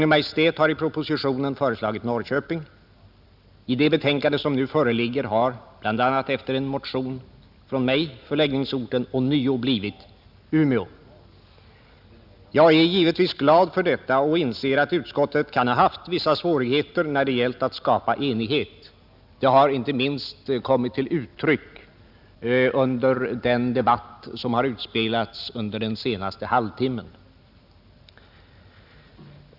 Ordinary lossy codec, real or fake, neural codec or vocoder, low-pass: none; real; none; 5.4 kHz